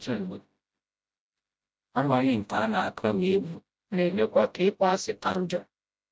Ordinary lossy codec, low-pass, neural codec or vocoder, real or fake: none; none; codec, 16 kHz, 0.5 kbps, FreqCodec, smaller model; fake